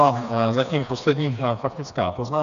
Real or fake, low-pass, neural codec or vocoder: fake; 7.2 kHz; codec, 16 kHz, 2 kbps, FreqCodec, smaller model